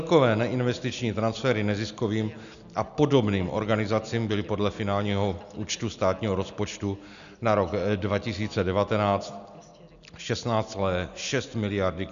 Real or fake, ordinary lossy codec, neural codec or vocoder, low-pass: real; MP3, 96 kbps; none; 7.2 kHz